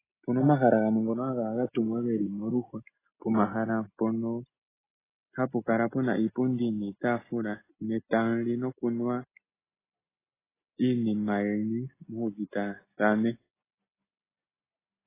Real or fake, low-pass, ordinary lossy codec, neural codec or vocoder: real; 3.6 kHz; AAC, 16 kbps; none